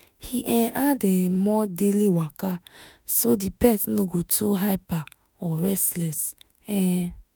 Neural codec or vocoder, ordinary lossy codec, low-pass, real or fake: autoencoder, 48 kHz, 32 numbers a frame, DAC-VAE, trained on Japanese speech; none; none; fake